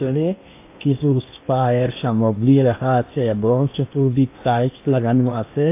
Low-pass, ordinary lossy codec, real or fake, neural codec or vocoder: 3.6 kHz; AAC, 24 kbps; fake; codec, 16 kHz in and 24 kHz out, 0.8 kbps, FocalCodec, streaming, 65536 codes